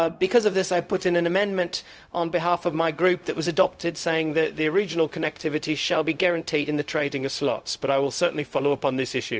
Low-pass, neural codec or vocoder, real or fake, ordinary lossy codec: none; codec, 16 kHz, 0.4 kbps, LongCat-Audio-Codec; fake; none